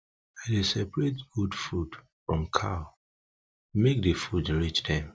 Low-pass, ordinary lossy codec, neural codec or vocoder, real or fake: none; none; none; real